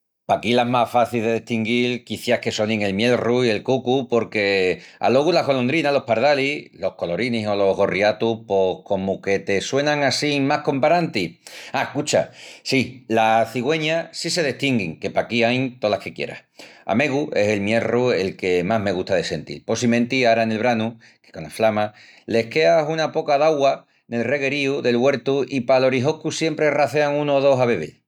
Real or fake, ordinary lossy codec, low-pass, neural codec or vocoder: real; none; 19.8 kHz; none